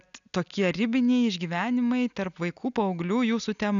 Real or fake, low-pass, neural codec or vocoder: real; 7.2 kHz; none